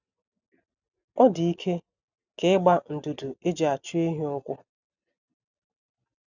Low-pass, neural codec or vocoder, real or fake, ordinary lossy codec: 7.2 kHz; none; real; none